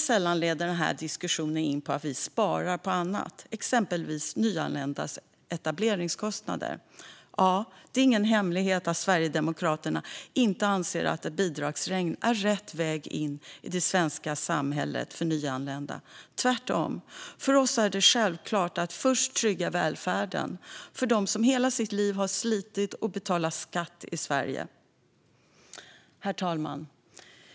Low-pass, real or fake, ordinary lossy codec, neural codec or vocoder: none; real; none; none